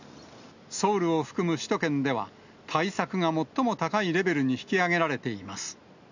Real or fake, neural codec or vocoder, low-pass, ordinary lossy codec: real; none; 7.2 kHz; none